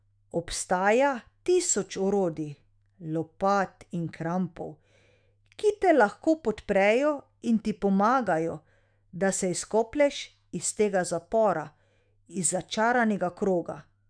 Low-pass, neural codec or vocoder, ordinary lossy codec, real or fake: 9.9 kHz; autoencoder, 48 kHz, 128 numbers a frame, DAC-VAE, trained on Japanese speech; none; fake